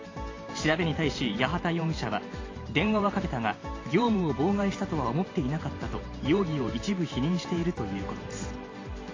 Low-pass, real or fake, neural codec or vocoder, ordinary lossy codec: 7.2 kHz; fake; vocoder, 44.1 kHz, 128 mel bands every 512 samples, BigVGAN v2; AAC, 32 kbps